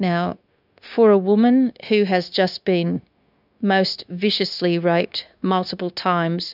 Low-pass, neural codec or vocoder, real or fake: 5.4 kHz; codec, 16 kHz, 0.9 kbps, LongCat-Audio-Codec; fake